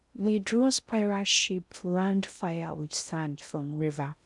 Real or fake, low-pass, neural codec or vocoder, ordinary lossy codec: fake; 10.8 kHz; codec, 16 kHz in and 24 kHz out, 0.6 kbps, FocalCodec, streaming, 2048 codes; none